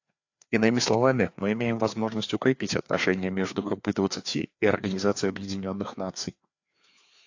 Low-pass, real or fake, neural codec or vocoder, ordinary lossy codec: 7.2 kHz; fake; codec, 16 kHz, 2 kbps, FreqCodec, larger model; AAC, 48 kbps